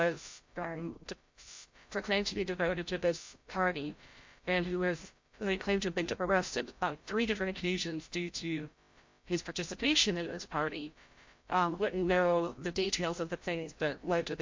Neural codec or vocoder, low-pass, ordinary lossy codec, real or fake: codec, 16 kHz, 0.5 kbps, FreqCodec, larger model; 7.2 kHz; MP3, 48 kbps; fake